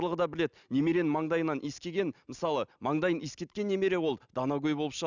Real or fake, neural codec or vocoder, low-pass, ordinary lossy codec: real; none; 7.2 kHz; none